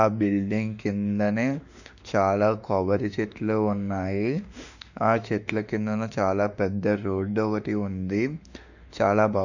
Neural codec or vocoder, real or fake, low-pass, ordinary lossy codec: autoencoder, 48 kHz, 32 numbers a frame, DAC-VAE, trained on Japanese speech; fake; 7.2 kHz; none